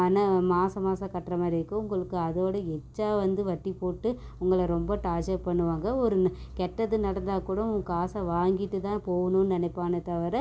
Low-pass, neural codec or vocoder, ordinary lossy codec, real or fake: none; none; none; real